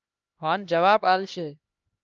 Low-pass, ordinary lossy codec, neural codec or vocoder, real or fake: 7.2 kHz; Opus, 24 kbps; codec, 16 kHz, 1 kbps, X-Codec, HuBERT features, trained on LibriSpeech; fake